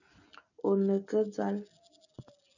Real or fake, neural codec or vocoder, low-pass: real; none; 7.2 kHz